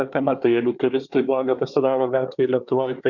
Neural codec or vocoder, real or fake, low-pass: codec, 24 kHz, 1 kbps, SNAC; fake; 7.2 kHz